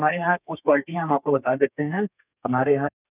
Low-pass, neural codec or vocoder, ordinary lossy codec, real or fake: 3.6 kHz; codec, 32 kHz, 1.9 kbps, SNAC; none; fake